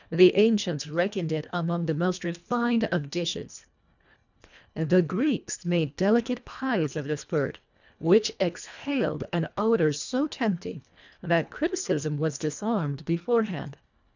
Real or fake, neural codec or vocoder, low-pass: fake; codec, 24 kHz, 1.5 kbps, HILCodec; 7.2 kHz